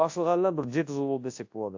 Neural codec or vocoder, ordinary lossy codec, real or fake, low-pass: codec, 24 kHz, 0.9 kbps, WavTokenizer, large speech release; AAC, 48 kbps; fake; 7.2 kHz